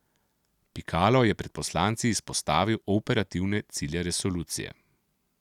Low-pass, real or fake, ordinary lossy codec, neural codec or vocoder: 19.8 kHz; real; none; none